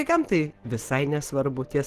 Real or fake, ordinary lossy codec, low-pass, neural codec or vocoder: real; Opus, 16 kbps; 14.4 kHz; none